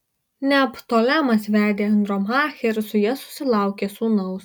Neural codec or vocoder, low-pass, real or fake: none; 19.8 kHz; real